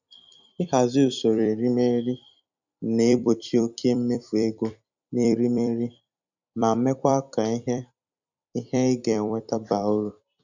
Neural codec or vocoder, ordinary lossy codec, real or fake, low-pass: vocoder, 44.1 kHz, 128 mel bands every 256 samples, BigVGAN v2; none; fake; 7.2 kHz